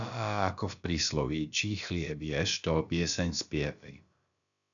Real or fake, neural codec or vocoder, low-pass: fake; codec, 16 kHz, about 1 kbps, DyCAST, with the encoder's durations; 7.2 kHz